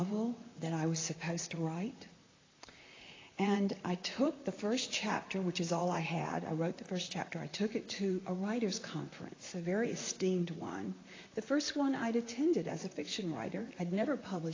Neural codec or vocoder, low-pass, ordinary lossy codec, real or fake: vocoder, 44.1 kHz, 128 mel bands, Pupu-Vocoder; 7.2 kHz; AAC, 32 kbps; fake